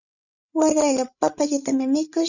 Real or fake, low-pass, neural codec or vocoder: fake; 7.2 kHz; codec, 16 kHz, 16 kbps, FreqCodec, larger model